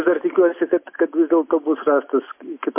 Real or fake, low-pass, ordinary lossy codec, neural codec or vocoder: real; 3.6 kHz; MP3, 24 kbps; none